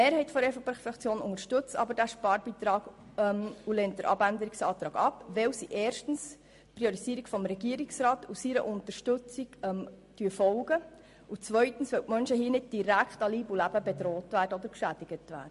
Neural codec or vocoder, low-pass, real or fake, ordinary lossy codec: none; 14.4 kHz; real; MP3, 48 kbps